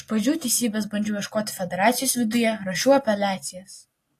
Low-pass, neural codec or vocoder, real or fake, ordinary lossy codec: 14.4 kHz; none; real; AAC, 48 kbps